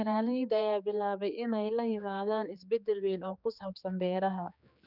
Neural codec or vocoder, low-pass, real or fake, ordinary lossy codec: codec, 16 kHz, 4 kbps, X-Codec, HuBERT features, trained on general audio; 5.4 kHz; fake; none